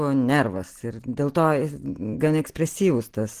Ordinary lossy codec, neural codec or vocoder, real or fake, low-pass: Opus, 16 kbps; none; real; 14.4 kHz